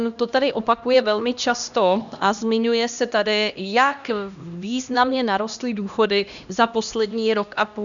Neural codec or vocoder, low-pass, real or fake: codec, 16 kHz, 1 kbps, X-Codec, HuBERT features, trained on LibriSpeech; 7.2 kHz; fake